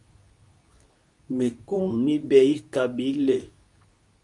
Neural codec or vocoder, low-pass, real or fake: codec, 24 kHz, 0.9 kbps, WavTokenizer, medium speech release version 2; 10.8 kHz; fake